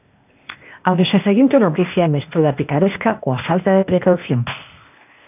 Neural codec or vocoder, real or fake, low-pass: codec, 16 kHz, 0.8 kbps, ZipCodec; fake; 3.6 kHz